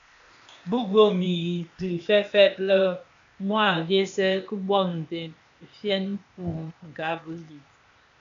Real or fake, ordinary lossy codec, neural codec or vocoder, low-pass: fake; AAC, 64 kbps; codec, 16 kHz, 0.8 kbps, ZipCodec; 7.2 kHz